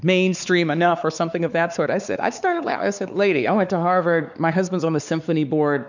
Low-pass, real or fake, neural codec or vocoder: 7.2 kHz; fake; codec, 16 kHz, 4 kbps, X-Codec, WavLM features, trained on Multilingual LibriSpeech